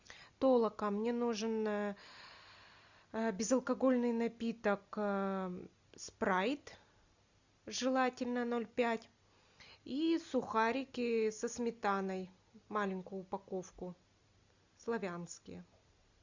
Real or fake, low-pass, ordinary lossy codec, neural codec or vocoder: real; 7.2 kHz; Opus, 64 kbps; none